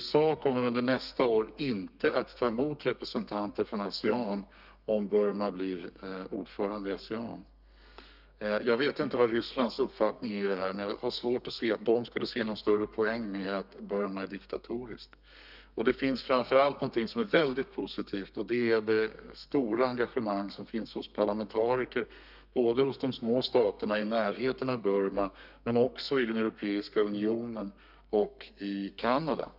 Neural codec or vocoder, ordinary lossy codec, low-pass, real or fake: codec, 32 kHz, 1.9 kbps, SNAC; none; 5.4 kHz; fake